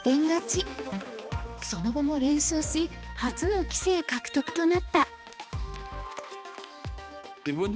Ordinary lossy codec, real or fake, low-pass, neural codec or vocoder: none; fake; none; codec, 16 kHz, 2 kbps, X-Codec, HuBERT features, trained on balanced general audio